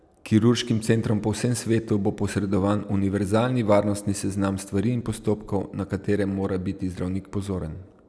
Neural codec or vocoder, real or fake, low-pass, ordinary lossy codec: none; real; none; none